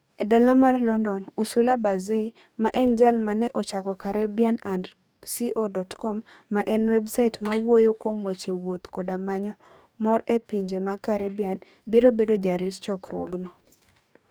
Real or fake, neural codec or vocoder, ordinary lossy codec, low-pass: fake; codec, 44.1 kHz, 2.6 kbps, DAC; none; none